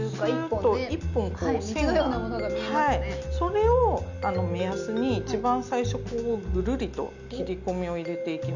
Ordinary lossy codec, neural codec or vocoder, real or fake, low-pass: none; none; real; 7.2 kHz